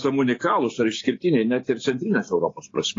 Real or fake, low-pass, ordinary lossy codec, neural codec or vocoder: fake; 7.2 kHz; AAC, 32 kbps; codec, 16 kHz, 6 kbps, DAC